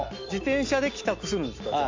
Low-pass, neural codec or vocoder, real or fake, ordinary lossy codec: 7.2 kHz; none; real; AAC, 48 kbps